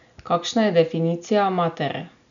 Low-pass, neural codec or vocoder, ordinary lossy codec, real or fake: 7.2 kHz; none; none; real